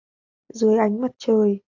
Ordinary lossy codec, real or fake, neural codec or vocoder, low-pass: AAC, 48 kbps; real; none; 7.2 kHz